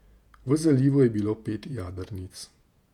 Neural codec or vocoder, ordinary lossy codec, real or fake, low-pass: vocoder, 48 kHz, 128 mel bands, Vocos; none; fake; 19.8 kHz